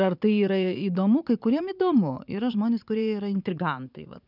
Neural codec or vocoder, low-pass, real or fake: vocoder, 44.1 kHz, 80 mel bands, Vocos; 5.4 kHz; fake